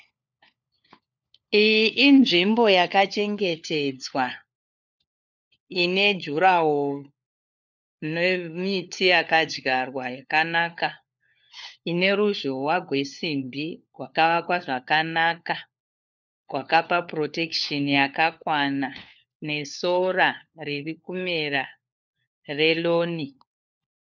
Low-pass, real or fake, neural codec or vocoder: 7.2 kHz; fake; codec, 16 kHz, 4 kbps, FunCodec, trained on LibriTTS, 50 frames a second